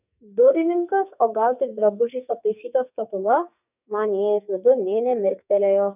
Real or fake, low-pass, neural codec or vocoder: fake; 3.6 kHz; codec, 44.1 kHz, 2.6 kbps, SNAC